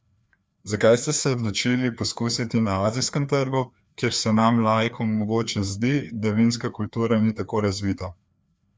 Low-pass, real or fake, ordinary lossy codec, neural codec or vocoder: none; fake; none; codec, 16 kHz, 2 kbps, FreqCodec, larger model